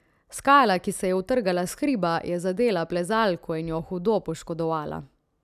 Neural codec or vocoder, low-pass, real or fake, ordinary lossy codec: none; 14.4 kHz; real; none